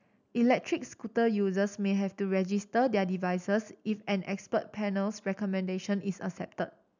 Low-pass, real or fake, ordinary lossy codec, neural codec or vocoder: 7.2 kHz; real; none; none